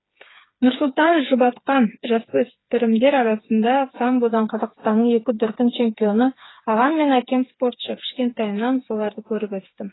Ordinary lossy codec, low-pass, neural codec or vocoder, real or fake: AAC, 16 kbps; 7.2 kHz; codec, 16 kHz, 4 kbps, FreqCodec, smaller model; fake